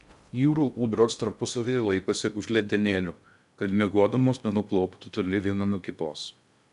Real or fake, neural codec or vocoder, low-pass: fake; codec, 16 kHz in and 24 kHz out, 0.6 kbps, FocalCodec, streaming, 2048 codes; 10.8 kHz